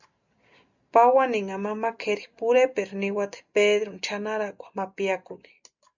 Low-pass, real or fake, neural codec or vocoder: 7.2 kHz; real; none